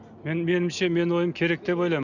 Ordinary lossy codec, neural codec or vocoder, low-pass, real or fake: none; none; 7.2 kHz; real